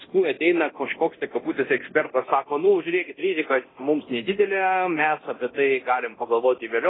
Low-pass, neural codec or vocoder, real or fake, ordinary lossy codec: 7.2 kHz; codec, 24 kHz, 0.9 kbps, DualCodec; fake; AAC, 16 kbps